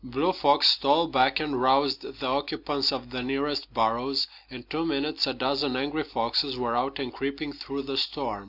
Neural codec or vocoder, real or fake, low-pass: none; real; 5.4 kHz